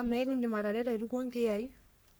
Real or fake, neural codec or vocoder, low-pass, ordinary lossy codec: fake; codec, 44.1 kHz, 3.4 kbps, Pupu-Codec; none; none